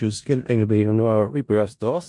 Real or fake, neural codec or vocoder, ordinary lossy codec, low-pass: fake; codec, 16 kHz in and 24 kHz out, 0.4 kbps, LongCat-Audio-Codec, four codebook decoder; MP3, 64 kbps; 10.8 kHz